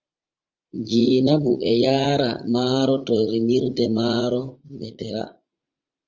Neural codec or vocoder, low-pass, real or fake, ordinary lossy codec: vocoder, 44.1 kHz, 80 mel bands, Vocos; 7.2 kHz; fake; Opus, 32 kbps